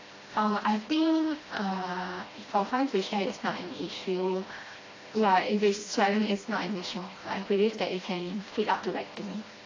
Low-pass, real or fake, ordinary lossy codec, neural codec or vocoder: 7.2 kHz; fake; AAC, 32 kbps; codec, 16 kHz, 1 kbps, FreqCodec, smaller model